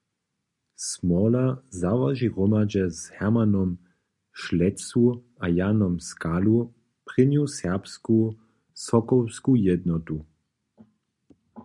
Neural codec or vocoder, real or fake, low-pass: none; real; 10.8 kHz